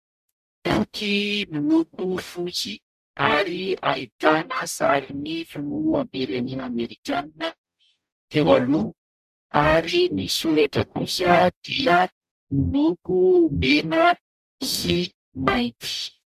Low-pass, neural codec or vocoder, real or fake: 14.4 kHz; codec, 44.1 kHz, 0.9 kbps, DAC; fake